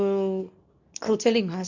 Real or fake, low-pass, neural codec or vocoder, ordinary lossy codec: fake; 7.2 kHz; codec, 24 kHz, 0.9 kbps, WavTokenizer, medium speech release version 2; none